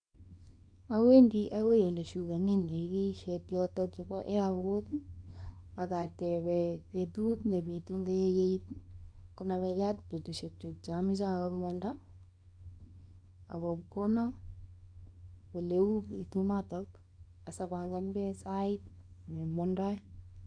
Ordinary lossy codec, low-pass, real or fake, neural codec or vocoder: none; 9.9 kHz; fake; codec, 24 kHz, 0.9 kbps, WavTokenizer, small release